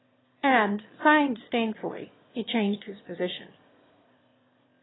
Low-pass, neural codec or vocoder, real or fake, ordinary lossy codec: 7.2 kHz; autoencoder, 22.05 kHz, a latent of 192 numbers a frame, VITS, trained on one speaker; fake; AAC, 16 kbps